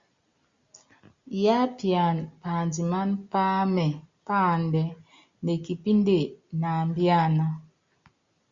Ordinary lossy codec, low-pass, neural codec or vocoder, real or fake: Opus, 64 kbps; 7.2 kHz; none; real